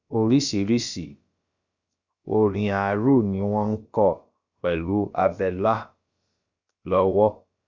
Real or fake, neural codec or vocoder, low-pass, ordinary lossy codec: fake; codec, 16 kHz, about 1 kbps, DyCAST, with the encoder's durations; 7.2 kHz; Opus, 64 kbps